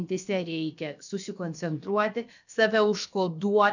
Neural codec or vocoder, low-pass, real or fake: codec, 16 kHz, about 1 kbps, DyCAST, with the encoder's durations; 7.2 kHz; fake